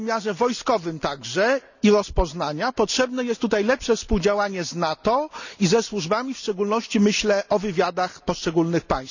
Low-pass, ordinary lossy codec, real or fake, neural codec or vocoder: 7.2 kHz; none; real; none